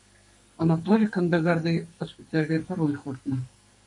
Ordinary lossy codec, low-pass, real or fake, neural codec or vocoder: MP3, 48 kbps; 10.8 kHz; fake; codec, 44.1 kHz, 2.6 kbps, SNAC